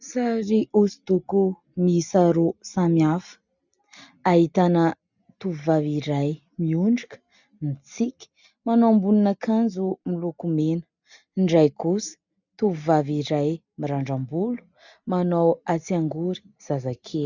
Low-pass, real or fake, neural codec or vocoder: 7.2 kHz; real; none